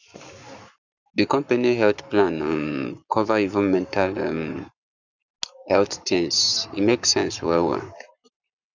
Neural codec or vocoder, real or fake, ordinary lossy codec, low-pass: codec, 44.1 kHz, 7.8 kbps, Pupu-Codec; fake; none; 7.2 kHz